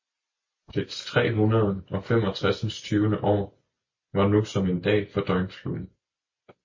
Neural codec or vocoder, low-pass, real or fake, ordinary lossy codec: none; 7.2 kHz; real; MP3, 32 kbps